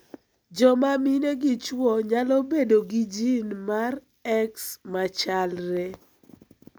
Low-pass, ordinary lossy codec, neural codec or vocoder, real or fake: none; none; none; real